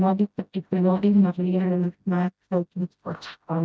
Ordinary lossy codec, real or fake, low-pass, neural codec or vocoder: none; fake; none; codec, 16 kHz, 0.5 kbps, FreqCodec, smaller model